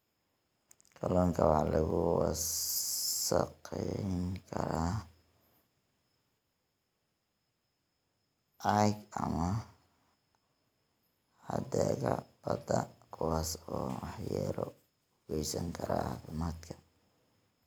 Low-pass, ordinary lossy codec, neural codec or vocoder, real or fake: none; none; none; real